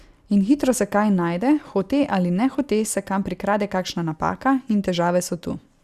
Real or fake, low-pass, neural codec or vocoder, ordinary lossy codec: real; 14.4 kHz; none; Opus, 64 kbps